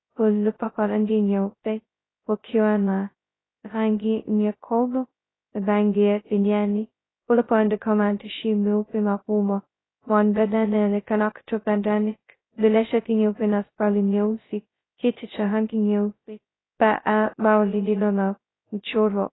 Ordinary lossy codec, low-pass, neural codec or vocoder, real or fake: AAC, 16 kbps; 7.2 kHz; codec, 16 kHz, 0.2 kbps, FocalCodec; fake